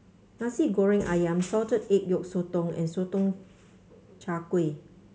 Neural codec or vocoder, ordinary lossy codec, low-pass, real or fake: none; none; none; real